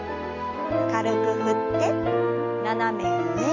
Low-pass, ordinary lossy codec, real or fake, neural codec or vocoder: 7.2 kHz; none; real; none